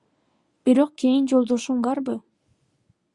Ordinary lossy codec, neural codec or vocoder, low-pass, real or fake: Opus, 64 kbps; codec, 24 kHz, 0.9 kbps, WavTokenizer, medium speech release version 1; 10.8 kHz; fake